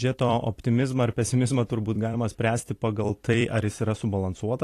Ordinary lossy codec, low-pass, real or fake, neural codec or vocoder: AAC, 48 kbps; 14.4 kHz; fake; vocoder, 44.1 kHz, 128 mel bands every 256 samples, BigVGAN v2